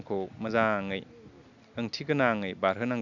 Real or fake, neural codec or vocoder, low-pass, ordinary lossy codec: real; none; 7.2 kHz; none